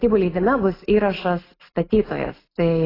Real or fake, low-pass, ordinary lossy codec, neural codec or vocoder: real; 5.4 kHz; AAC, 24 kbps; none